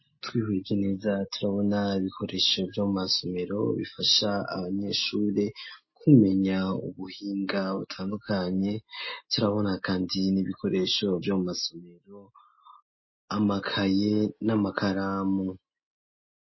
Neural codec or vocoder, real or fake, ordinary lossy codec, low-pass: none; real; MP3, 24 kbps; 7.2 kHz